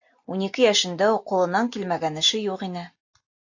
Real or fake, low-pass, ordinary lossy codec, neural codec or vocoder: real; 7.2 kHz; AAC, 48 kbps; none